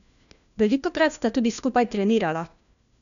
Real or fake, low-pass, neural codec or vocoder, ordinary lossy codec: fake; 7.2 kHz; codec, 16 kHz, 1 kbps, FunCodec, trained on LibriTTS, 50 frames a second; none